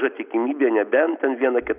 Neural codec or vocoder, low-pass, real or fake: none; 3.6 kHz; real